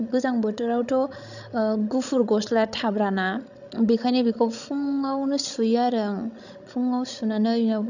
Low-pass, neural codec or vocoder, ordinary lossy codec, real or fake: 7.2 kHz; codec, 16 kHz, 16 kbps, FreqCodec, larger model; none; fake